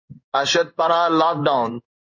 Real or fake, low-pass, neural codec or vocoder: fake; 7.2 kHz; codec, 16 kHz in and 24 kHz out, 1 kbps, XY-Tokenizer